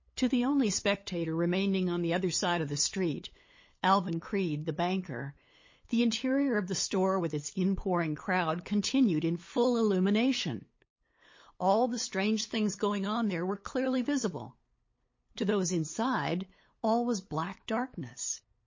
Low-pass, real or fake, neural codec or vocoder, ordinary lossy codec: 7.2 kHz; fake; codec, 16 kHz, 8 kbps, FunCodec, trained on LibriTTS, 25 frames a second; MP3, 32 kbps